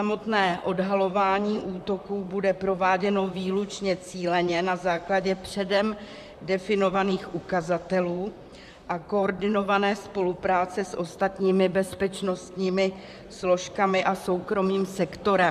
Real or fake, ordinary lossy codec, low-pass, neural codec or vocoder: fake; MP3, 96 kbps; 14.4 kHz; vocoder, 44.1 kHz, 128 mel bands, Pupu-Vocoder